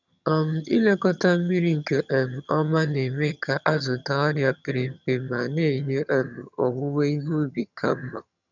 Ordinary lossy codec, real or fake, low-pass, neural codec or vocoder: none; fake; 7.2 kHz; vocoder, 22.05 kHz, 80 mel bands, HiFi-GAN